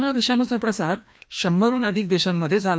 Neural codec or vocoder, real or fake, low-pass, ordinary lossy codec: codec, 16 kHz, 1 kbps, FreqCodec, larger model; fake; none; none